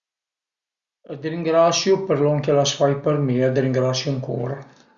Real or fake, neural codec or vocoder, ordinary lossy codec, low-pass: real; none; Opus, 64 kbps; 7.2 kHz